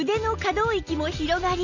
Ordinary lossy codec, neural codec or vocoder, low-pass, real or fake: none; none; 7.2 kHz; real